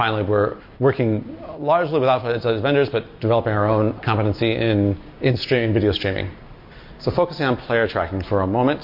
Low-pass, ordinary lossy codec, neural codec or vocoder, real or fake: 5.4 kHz; MP3, 32 kbps; none; real